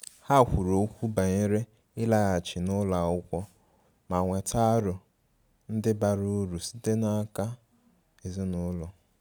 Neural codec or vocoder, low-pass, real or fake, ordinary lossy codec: none; none; real; none